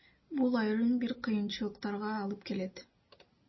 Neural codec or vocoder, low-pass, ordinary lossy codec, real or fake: none; 7.2 kHz; MP3, 24 kbps; real